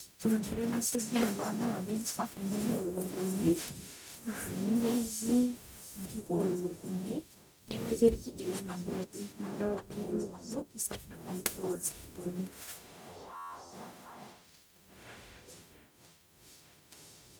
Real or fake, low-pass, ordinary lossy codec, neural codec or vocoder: fake; none; none; codec, 44.1 kHz, 0.9 kbps, DAC